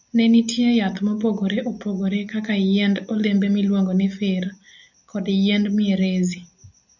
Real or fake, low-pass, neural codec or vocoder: real; 7.2 kHz; none